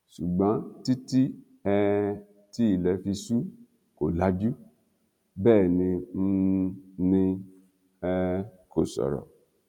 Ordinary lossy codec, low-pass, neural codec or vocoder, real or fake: none; 19.8 kHz; none; real